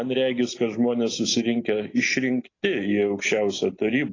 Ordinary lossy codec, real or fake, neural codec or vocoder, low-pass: AAC, 32 kbps; real; none; 7.2 kHz